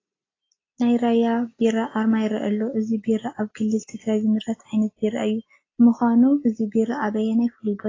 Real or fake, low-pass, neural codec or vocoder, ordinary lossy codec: real; 7.2 kHz; none; AAC, 32 kbps